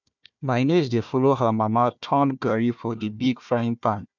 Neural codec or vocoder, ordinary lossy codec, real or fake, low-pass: codec, 16 kHz, 1 kbps, FunCodec, trained on Chinese and English, 50 frames a second; none; fake; 7.2 kHz